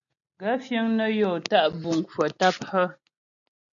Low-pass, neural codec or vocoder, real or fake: 7.2 kHz; none; real